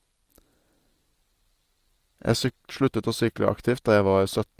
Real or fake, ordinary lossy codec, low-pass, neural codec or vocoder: real; Opus, 24 kbps; 14.4 kHz; none